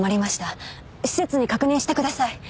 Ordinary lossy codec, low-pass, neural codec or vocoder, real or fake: none; none; none; real